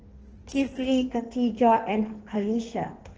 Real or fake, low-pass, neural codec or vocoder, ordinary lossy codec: fake; 7.2 kHz; codec, 16 kHz in and 24 kHz out, 1.1 kbps, FireRedTTS-2 codec; Opus, 16 kbps